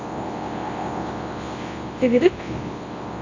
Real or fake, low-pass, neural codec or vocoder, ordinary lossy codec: fake; 7.2 kHz; codec, 24 kHz, 0.9 kbps, WavTokenizer, large speech release; AAC, 32 kbps